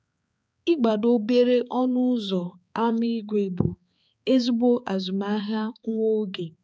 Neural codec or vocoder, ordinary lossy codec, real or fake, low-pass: codec, 16 kHz, 4 kbps, X-Codec, HuBERT features, trained on balanced general audio; none; fake; none